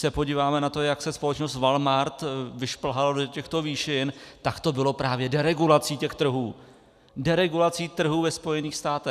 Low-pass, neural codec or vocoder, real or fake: 14.4 kHz; none; real